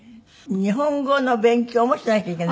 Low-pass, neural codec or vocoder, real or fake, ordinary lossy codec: none; none; real; none